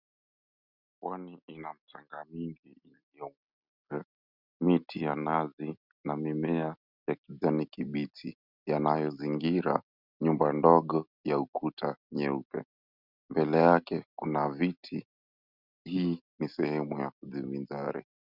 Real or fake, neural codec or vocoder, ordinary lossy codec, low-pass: real; none; Opus, 64 kbps; 5.4 kHz